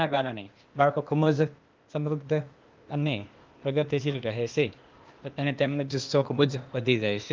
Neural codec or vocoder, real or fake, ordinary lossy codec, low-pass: codec, 16 kHz, 0.8 kbps, ZipCodec; fake; Opus, 32 kbps; 7.2 kHz